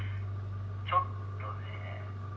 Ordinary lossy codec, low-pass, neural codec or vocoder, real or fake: none; none; none; real